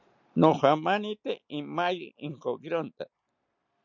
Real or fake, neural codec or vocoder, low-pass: real; none; 7.2 kHz